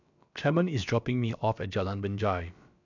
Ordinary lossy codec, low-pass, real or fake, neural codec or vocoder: none; 7.2 kHz; fake; codec, 16 kHz, about 1 kbps, DyCAST, with the encoder's durations